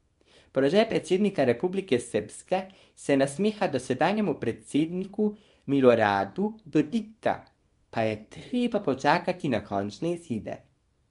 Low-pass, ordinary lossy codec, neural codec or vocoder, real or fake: 10.8 kHz; MP3, 64 kbps; codec, 24 kHz, 0.9 kbps, WavTokenizer, small release; fake